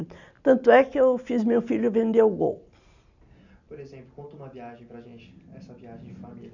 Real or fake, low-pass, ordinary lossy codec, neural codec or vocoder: real; 7.2 kHz; none; none